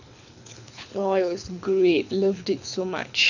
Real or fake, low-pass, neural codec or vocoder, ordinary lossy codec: fake; 7.2 kHz; codec, 24 kHz, 6 kbps, HILCodec; none